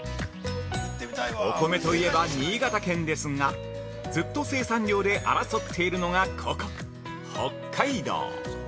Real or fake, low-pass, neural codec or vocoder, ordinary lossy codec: real; none; none; none